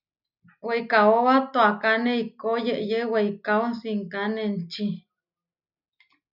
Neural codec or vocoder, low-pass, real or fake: none; 5.4 kHz; real